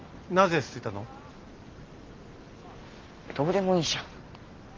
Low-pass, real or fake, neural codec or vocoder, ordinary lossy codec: 7.2 kHz; real; none; Opus, 32 kbps